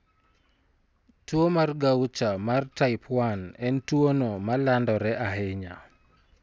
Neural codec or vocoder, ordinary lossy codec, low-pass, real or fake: none; none; none; real